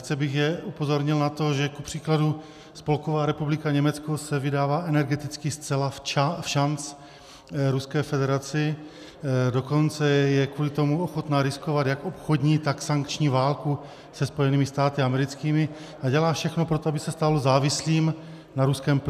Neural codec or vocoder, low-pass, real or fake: none; 14.4 kHz; real